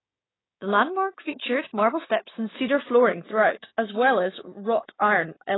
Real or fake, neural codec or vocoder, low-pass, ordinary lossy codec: fake; codec, 16 kHz, 6 kbps, DAC; 7.2 kHz; AAC, 16 kbps